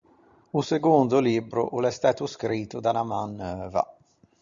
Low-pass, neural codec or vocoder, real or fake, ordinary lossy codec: 7.2 kHz; none; real; Opus, 64 kbps